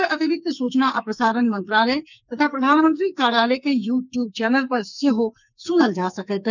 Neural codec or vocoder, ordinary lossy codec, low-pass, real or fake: codec, 44.1 kHz, 2.6 kbps, SNAC; none; 7.2 kHz; fake